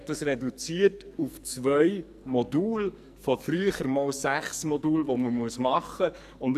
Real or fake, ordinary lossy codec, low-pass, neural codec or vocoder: fake; none; 14.4 kHz; codec, 44.1 kHz, 2.6 kbps, SNAC